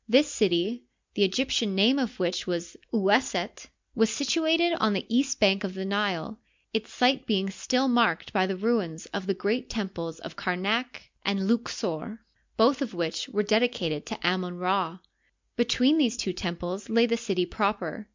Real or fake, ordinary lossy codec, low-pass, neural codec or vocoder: real; MP3, 64 kbps; 7.2 kHz; none